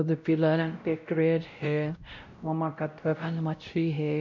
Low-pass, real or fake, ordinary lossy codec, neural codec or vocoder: 7.2 kHz; fake; none; codec, 16 kHz, 0.5 kbps, X-Codec, WavLM features, trained on Multilingual LibriSpeech